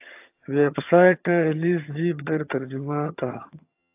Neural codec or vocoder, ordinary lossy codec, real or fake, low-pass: vocoder, 22.05 kHz, 80 mel bands, HiFi-GAN; AAC, 24 kbps; fake; 3.6 kHz